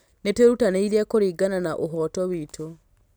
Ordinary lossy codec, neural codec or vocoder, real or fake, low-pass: none; none; real; none